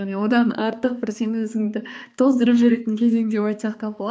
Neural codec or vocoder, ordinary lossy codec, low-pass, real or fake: codec, 16 kHz, 2 kbps, X-Codec, HuBERT features, trained on balanced general audio; none; none; fake